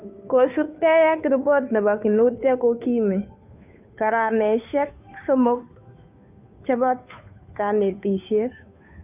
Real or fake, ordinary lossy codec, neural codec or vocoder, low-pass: fake; none; codec, 16 kHz, 2 kbps, FunCodec, trained on Chinese and English, 25 frames a second; 3.6 kHz